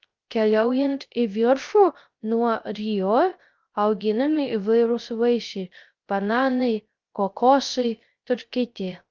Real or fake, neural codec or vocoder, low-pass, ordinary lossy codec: fake; codec, 16 kHz, 0.3 kbps, FocalCodec; 7.2 kHz; Opus, 32 kbps